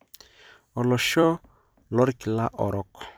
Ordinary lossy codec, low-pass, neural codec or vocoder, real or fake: none; none; vocoder, 44.1 kHz, 128 mel bands every 256 samples, BigVGAN v2; fake